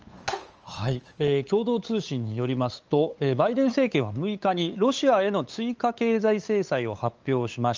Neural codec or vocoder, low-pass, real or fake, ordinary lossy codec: codec, 16 kHz, 8 kbps, FunCodec, trained on LibriTTS, 25 frames a second; 7.2 kHz; fake; Opus, 24 kbps